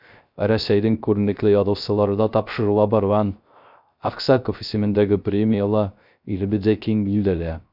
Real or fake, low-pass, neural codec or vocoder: fake; 5.4 kHz; codec, 16 kHz, 0.3 kbps, FocalCodec